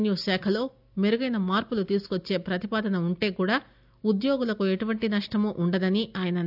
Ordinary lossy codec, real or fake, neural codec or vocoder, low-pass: none; real; none; 5.4 kHz